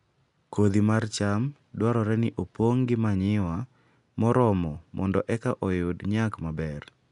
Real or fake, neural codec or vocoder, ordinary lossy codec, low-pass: real; none; none; 10.8 kHz